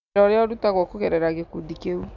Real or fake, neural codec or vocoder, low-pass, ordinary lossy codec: real; none; 7.2 kHz; none